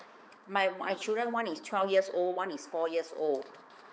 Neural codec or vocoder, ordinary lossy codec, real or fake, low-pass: codec, 16 kHz, 4 kbps, X-Codec, HuBERT features, trained on balanced general audio; none; fake; none